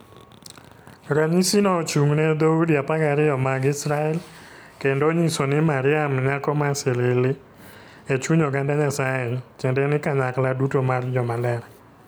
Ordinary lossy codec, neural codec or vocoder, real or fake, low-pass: none; none; real; none